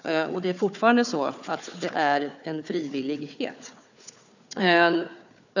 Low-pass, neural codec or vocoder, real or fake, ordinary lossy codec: 7.2 kHz; codec, 16 kHz, 4 kbps, FunCodec, trained on Chinese and English, 50 frames a second; fake; none